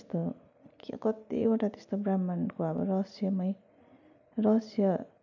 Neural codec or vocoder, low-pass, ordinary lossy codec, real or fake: none; 7.2 kHz; none; real